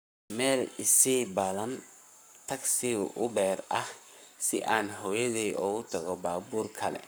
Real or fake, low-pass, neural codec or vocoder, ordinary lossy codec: fake; none; codec, 44.1 kHz, 7.8 kbps, Pupu-Codec; none